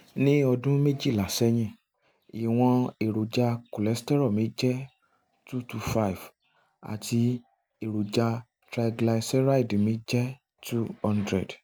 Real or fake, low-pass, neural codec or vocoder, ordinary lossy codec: real; none; none; none